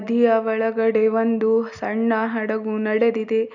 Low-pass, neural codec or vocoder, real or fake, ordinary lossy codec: 7.2 kHz; none; real; none